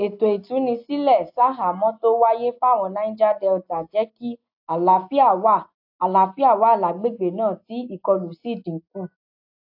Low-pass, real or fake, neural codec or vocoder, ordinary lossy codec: 5.4 kHz; real; none; AAC, 48 kbps